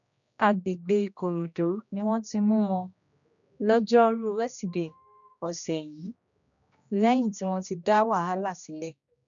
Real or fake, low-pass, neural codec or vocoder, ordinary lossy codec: fake; 7.2 kHz; codec, 16 kHz, 1 kbps, X-Codec, HuBERT features, trained on general audio; none